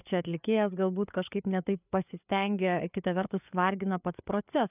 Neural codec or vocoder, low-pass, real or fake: codec, 16 kHz, 4 kbps, FreqCodec, larger model; 3.6 kHz; fake